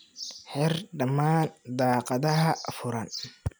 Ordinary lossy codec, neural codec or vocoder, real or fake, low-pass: none; none; real; none